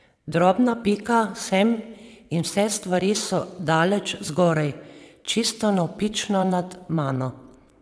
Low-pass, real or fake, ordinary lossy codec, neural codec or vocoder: none; fake; none; vocoder, 22.05 kHz, 80 mel bands, WaveNeXt